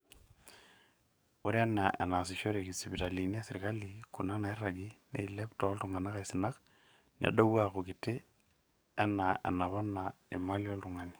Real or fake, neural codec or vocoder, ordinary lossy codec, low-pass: fake; codec, 44.1 kHz, 7.8 kbps, DAC; none; none